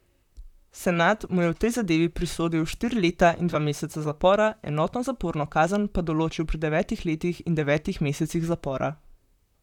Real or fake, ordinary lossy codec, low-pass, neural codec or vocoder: fake; none; 19.8 kHz; vocoder, 44.1 kHz, 128 mel bands, Pupu-Vocoder